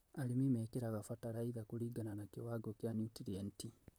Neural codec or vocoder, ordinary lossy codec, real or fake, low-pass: vocoder, 44.1 kHz, 128 mel bands, Pupu-Vocoder; none; fake; none